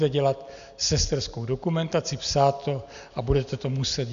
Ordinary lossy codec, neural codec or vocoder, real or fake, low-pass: AAC, 96 kbps; none; real; 7.2 kHz